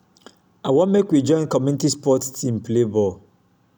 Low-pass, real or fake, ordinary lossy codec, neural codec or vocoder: none; real; none; none